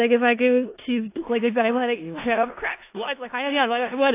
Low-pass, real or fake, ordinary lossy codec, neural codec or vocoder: 3.6 kHz; fake; AAC, 24 kbps; codec, 16 kHz in and 24 kHz out, 0.4 kbps, LongCat-Audio-Codec, four codebook decoder